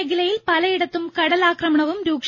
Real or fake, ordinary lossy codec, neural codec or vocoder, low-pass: real; MP3, 32 kbps; none; 7.2 kHz